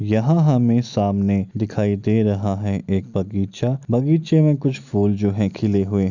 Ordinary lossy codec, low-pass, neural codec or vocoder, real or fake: none; 7.2 kHz; none; real